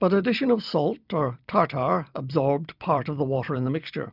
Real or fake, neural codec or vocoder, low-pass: fake; vocoder, 44.1 kHz, 128 mel bands every 256 samples, BigVGAN v2; 5.4 kHz